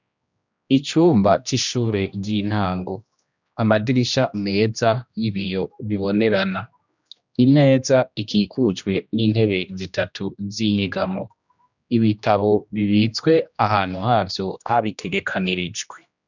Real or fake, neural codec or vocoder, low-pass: fake; codec, 16 kHz, 1 kbps, X-Codec, HuBERT features, trained on general audio; 7.2 kHz